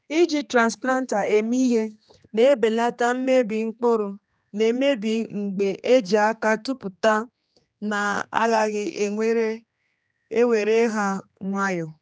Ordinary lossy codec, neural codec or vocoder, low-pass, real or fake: none; codec, 16 kHz, 2 kbps, X-Codec, HuBERT features, trained on general audio; none; fake